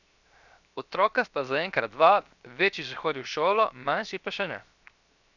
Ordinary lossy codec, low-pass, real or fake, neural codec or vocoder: none; 7.2 kHz; fake; codec, 16 kHz, 0.7 kbps, FocalCodec